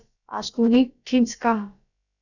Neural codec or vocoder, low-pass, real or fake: codec, 16 kHz, about 1 kbps, DyCAST, with the encoder's durations; 7.2 kHz; fake